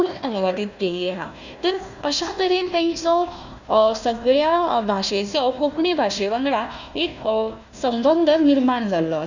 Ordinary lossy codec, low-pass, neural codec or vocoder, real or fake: none; 7.2 kHz; codec, 16 kHz, 1 kbps, FunCodec, trained on Chinese and English, 50 frames a second; fake